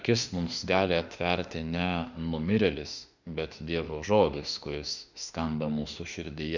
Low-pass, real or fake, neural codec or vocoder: 7.2 kHz; fake; autoencoder, 48 kHz, 32 numbers a frame, DAC-VAE, trained on Japanese speech